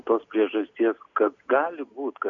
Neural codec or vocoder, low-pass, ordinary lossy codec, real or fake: none; 7.2 kHz; AAC, 64 kbps; real